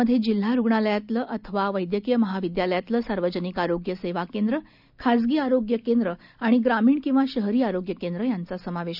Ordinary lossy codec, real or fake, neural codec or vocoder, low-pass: none; real; none; 5.4 kHz